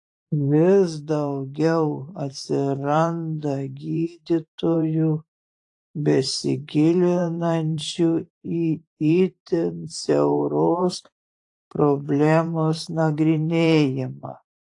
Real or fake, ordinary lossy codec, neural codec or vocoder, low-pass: fake; AAC, 48 kbps; vocoder, 44.1 kHz, 128 mel bands every 512 samples, BigVGAN v2; 10.8 kHz